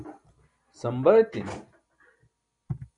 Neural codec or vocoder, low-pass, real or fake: none; 9.9 kHz; real